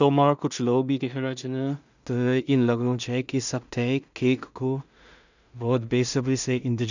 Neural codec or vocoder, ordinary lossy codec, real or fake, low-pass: codec, 16 kHz in and 24 kHz out, 0.4 kbps, LongCat-Audio-Codec, two codebook decoder; none; fake; 7.2 kHz